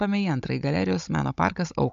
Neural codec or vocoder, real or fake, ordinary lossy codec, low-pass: codec, 16 kHz, 16 kbps, FunCodec, trained on Chinese and English, 50 frames a second; fake; MP3, 64 kbps; 7.2 kHz